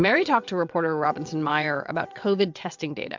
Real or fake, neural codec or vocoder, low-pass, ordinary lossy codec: fake; vocoder, 22.05 kHz, 80 mel bands, WaveNeXt; 7.2 kHz; MP3, 64 kbps